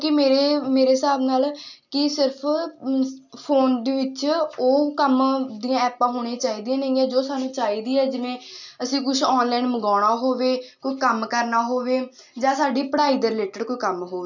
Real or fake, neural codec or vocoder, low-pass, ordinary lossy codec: real; none; 7.2 kHz; none